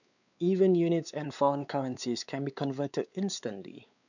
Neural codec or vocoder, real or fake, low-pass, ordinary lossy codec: codec, 16 kHz, 4 kbps, X-Codec, WavLM features, trained on Multilingual LibriSpeech; fake; 7.2 kHz; none